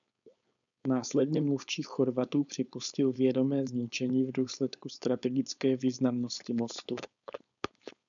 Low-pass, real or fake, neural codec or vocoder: 7.2 kHz; fake; codec, 16 kHz, 4.8 kbps, FACodec